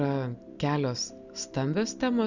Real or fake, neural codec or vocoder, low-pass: real; none; 7.2 kHz